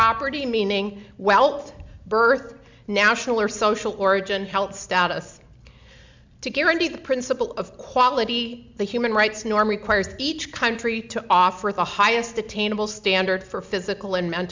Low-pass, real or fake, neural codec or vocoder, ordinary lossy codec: 7.2 kHz; real; none; MP3, 64 kbps